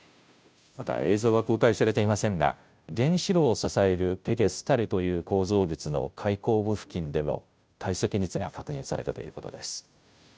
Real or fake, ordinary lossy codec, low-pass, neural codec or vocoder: fake; none; none; codec, 16 kHz, 0.5 kbps, FunCodec, trained on Chinese and English, 25 frames a second